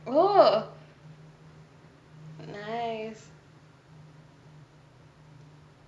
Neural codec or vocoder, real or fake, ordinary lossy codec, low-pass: none; real; none; none